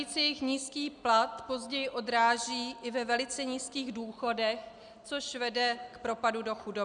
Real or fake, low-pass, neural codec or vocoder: real; 9.9 kHz; none